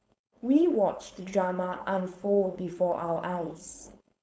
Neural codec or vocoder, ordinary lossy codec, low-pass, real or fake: codec, 16 kHz, 4.8 kbps, FACodec; none; none; fake